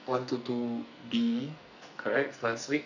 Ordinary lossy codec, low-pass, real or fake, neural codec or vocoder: none; 7.2 kHz; fake; codec, 44.1 kHz, 2.6 kbps, SNAC